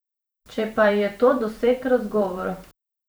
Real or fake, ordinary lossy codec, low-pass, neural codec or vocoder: fake; none; none; vocoder, 44.1 kHz, 128 mel bands every 512 samples, BigVGAN v2